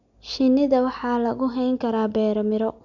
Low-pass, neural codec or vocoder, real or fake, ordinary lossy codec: 7.2 kHz; none; real; none